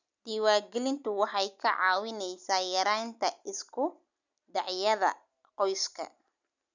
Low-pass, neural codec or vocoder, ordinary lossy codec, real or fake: 7.2 kHz; none; none; real